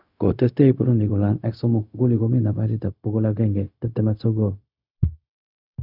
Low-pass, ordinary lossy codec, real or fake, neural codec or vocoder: 5.4 kHz; none; fake; codec, 16 kHz, 0.4 kbps, LongCat-Audio-Codec